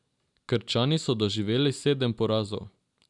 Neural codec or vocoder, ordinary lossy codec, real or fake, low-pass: none; none; real; 10.8 kHz